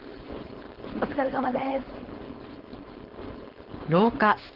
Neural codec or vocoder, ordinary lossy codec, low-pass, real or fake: codec, 16 kHz, 4.8 kbps, FACodec; Opus, 16 kbps; 5.4 kHz; fake